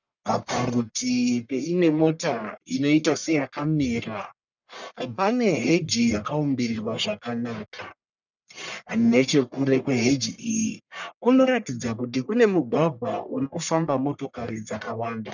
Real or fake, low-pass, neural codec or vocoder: fake; 7.2 kHz; codec, 44.1 kHz, 1.7 kbps, Pupu-Codec